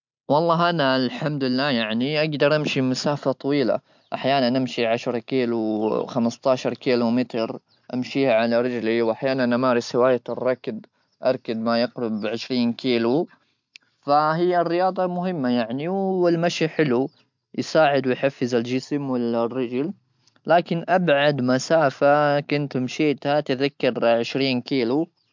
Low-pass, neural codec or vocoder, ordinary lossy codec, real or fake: 7.2 kHz; none; MP3, 64 kbps; real